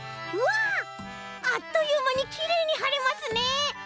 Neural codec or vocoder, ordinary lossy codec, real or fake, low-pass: none; none; real; none